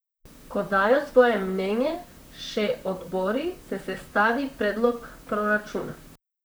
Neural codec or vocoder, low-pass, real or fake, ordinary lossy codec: vocoder, 44.1 kHz, 128 mel bands, Pupu-Vocoder; none; fake; none